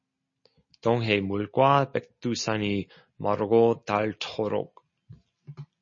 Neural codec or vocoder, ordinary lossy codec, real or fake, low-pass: none; MP3, 32 kbps; real; 7.2 kHz